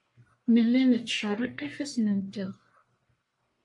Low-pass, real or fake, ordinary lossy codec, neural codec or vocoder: 10.8 kHz; fake; AAC, 64 kbps; codec, 44.1 kHz, 1.7 kbps, Pupu-Codec